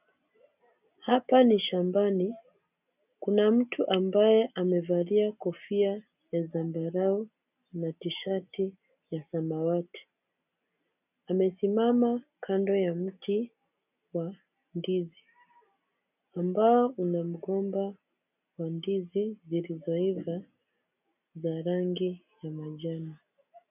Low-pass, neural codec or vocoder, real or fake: 3.6 kHz; none; real